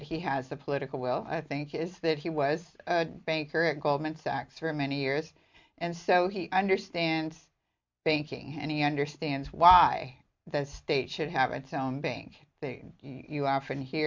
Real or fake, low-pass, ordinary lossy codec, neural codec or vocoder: real; 7.2 kHz; MP3, 64 kbps; none